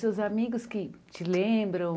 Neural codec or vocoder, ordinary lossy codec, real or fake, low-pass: none; none; real; none